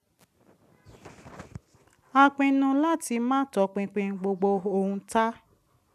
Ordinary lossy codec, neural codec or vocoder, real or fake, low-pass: none; none; real; 14.4 kHz